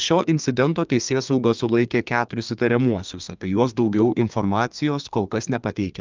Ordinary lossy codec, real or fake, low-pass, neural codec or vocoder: Opus, 24 kbps; fake; 7.2 kHz; codec, 32 kHz, 1.9 kbps, SNAC